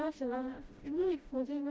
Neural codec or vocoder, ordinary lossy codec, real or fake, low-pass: codec, 16 kHz, 0.5 kbps, FreqCodec, smaller model; none; fake; none